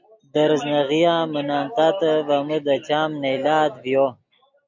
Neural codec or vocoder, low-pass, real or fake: none; 7.2 kHz; real